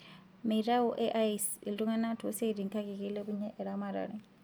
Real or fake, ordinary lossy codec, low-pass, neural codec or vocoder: real; none; none; none